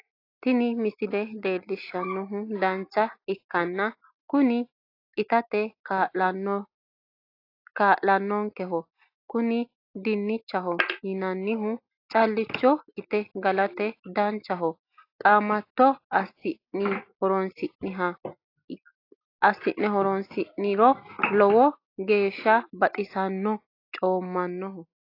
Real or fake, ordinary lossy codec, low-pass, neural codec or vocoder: real; AAC, 32 kbps; 5.4 kHz; none